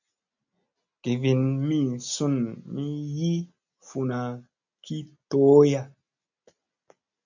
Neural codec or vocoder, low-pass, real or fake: none; 7.2 kHz; real